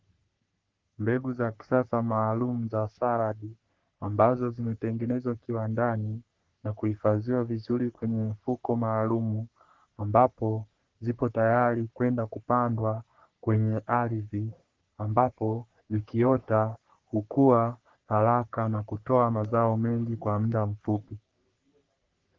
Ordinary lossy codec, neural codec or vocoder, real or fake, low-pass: Opus, 16 kbps; codec, 44.1 kHz, 3.4 kbps, Pupu-Codec; fake; 7.2 kHz